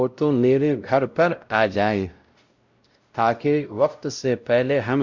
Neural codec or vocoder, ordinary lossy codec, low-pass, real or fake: codec, 16 kHz, 0.5 kbps, X-Codec, WavLM features, trained on Multilingual LibriSpeech; Opus, 64 kbps; 7.2 kHz; fake